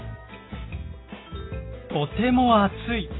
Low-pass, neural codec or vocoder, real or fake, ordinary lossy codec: 7.2 kHz; none; real; AAC, 16 kbps